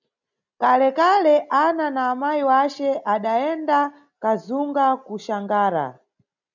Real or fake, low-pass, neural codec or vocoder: real; 7.2 kHz; none